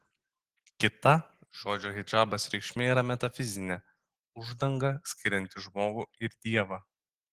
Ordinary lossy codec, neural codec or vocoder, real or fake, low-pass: Opus, 16 kbps; none; real; 14.4 kHz